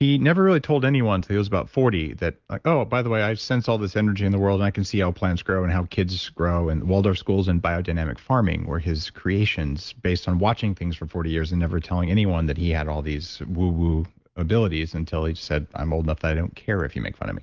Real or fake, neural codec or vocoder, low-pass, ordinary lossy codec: real; none; 7.2 kHz; Opus, 24 kbps